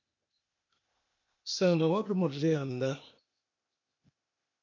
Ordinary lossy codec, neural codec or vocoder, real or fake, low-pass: MP3, 48 kbps; codec, 16 kHz, 0.8 kbps, ZipCodec; fake; 7.2 kHz